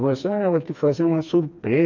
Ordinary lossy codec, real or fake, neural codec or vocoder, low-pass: none; fake; codec, 16 kHz, 2 kbps, FreqCodec, smaller model; 7.2 kHz